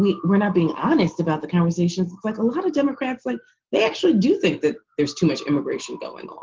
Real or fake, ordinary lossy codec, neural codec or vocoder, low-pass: real; Opus, 16 kbps; none; 7.2 kHz